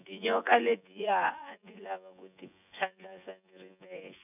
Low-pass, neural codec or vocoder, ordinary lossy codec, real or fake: 3.6 kHz; vocoder, 24 kHz, 100 mel bands, Vocos; none; fake